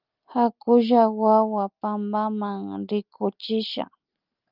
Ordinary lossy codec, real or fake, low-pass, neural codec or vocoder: Opus, 24 kbps; real; 5.4 kHz; none